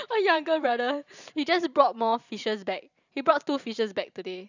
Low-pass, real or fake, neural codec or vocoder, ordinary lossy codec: 7.2 kHz; fake; vocoder, 44.1 kHz, 128 mel bands every 512 samples, BigVGAN v2; none